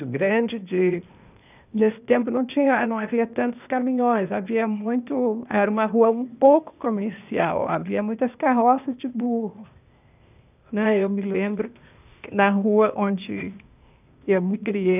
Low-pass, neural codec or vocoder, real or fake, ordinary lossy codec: 3.6 kHz; codec, 16 kHz, 0.8 kbps, ZipCodec; fake; none